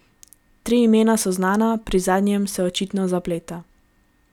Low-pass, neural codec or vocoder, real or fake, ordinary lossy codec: 19.8 kHz; none; real; none